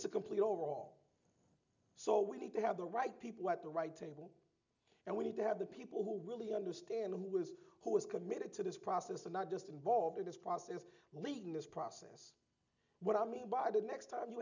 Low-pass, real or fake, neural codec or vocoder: 7.2 kHz; real; none